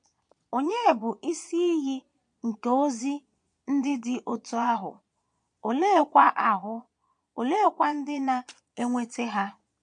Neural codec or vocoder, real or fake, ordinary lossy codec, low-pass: vocoder, 22.05 kHz, 80 mel bands, Vocos; fake; MP3, 64 kbps; 9.9 kHz